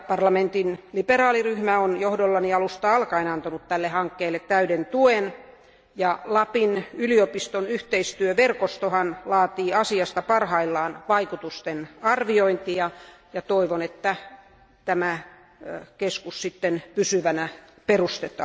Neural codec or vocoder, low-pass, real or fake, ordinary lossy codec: none; none; real; none